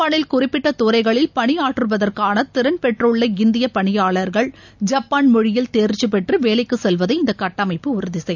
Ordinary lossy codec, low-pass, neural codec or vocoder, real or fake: none; 7.2 kHz; none; real